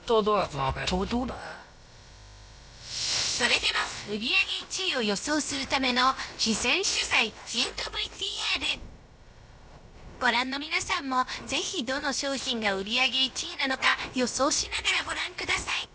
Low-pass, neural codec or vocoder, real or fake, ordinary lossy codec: none; codec, 16 kHz, about 1 kbps, DyCAST, with the encoder's durations; fake; none